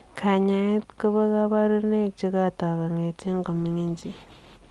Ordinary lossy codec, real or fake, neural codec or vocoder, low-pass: Opus, 32 kbps; real; none; 10.8 kHz